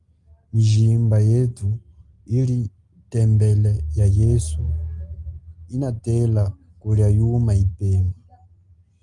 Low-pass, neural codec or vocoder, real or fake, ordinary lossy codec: 10.8 kHz; none; real; Opus, 32 kbps